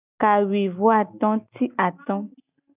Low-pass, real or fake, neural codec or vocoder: 3.6 kHz; real; none